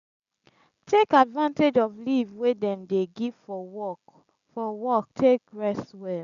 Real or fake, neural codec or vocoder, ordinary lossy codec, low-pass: real; none; none; 7.2 kHz